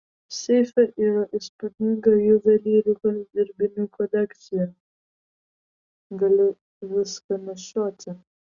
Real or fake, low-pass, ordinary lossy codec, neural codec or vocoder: real; 7.2 kHz; Opus, 64 kbps; none